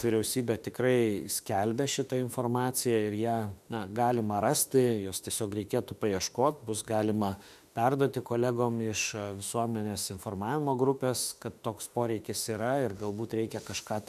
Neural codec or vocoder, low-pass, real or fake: autoencoder, 48 kHz, 32 numbers a frame, DAC-VAE, trained on Japanese speech; 14.4 kHz; fake